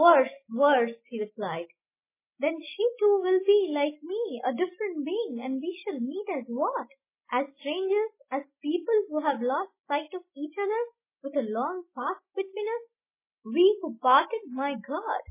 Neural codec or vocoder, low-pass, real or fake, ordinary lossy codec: none; 3.6 kHz; real; MP3, 16 kbps